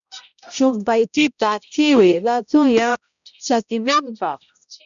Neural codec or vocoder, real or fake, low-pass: codec, 16 kHz, 0.5 kbps, X-Codec, HuBERT features, trained on balanced general audio; fake; 7.2 kHz